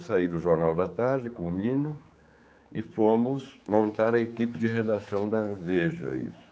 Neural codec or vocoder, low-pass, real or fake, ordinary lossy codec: codec, 16 kHz, 4 kbps, X-Codec, HuBERT features, trained on general audio; none; fake; none